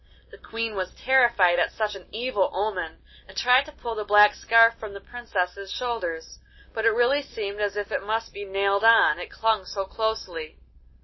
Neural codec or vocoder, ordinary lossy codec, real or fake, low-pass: none; MP3, 24 kbps; real; 7.2 kHz